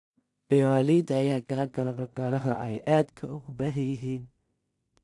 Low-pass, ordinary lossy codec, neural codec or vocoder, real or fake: 10.8 kHz; AAC, 64 kbps; codec, 16 kHz in and 24 kHz out, 0.4 kbps, LongCat-Audio-Codec, two codebook decoder; fake